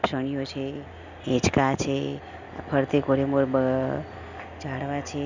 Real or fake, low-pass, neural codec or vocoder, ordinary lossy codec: real; 7.2 kHz; none; none